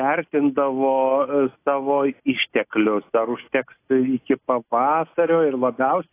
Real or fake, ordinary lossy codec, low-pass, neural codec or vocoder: real; AAC, 24 kbps; 3.6 kHz; none